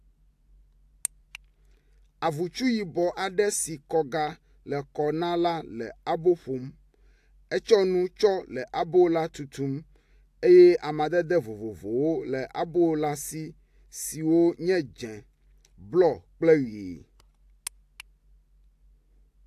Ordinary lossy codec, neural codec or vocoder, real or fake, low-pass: AAC, 64 kbps; none; real; 14.4 kHz